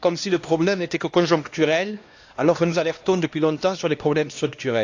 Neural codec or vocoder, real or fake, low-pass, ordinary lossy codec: codec, 16 kHz, 1 kbps, X-Codec, HuBERT features, trained on LibriSpeech; fake; 7.2 kHz; AAC, 48 kbps